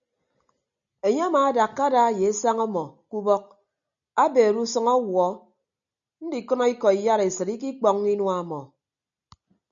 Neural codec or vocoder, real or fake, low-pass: none; real; 7.2 kHz